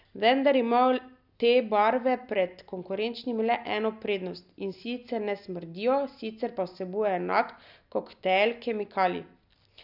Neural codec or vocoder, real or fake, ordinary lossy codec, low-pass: none; real; none; 5.4 kHz